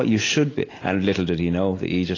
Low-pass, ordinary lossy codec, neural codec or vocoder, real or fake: 7.2 kHz; AAC, 32 kbps; none; real